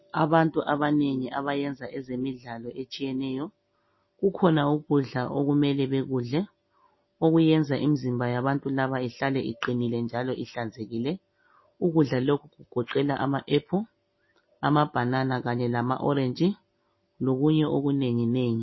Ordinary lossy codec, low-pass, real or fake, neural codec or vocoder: MP3, 24 kbps; 7.2 kHz; real; none